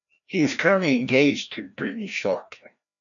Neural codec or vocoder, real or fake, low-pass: codec, 16 kHz, 1 kbps, FreqCodec, larger model; fake; 7.2 kHz